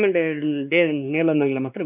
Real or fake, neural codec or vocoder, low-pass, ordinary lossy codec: fake; codec, 16 kHz, 2 kbps, X-Codec, WavLM features, trained on Multilingual LibriSpeech; 3.6 kHz; none